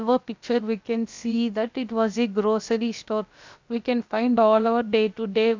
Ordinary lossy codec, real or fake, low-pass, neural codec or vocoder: MP3, 64 kbps; fake; 7.2 kHz; codec, 16 kHz, about 1 kbps, DyCAST, with the encoder's durations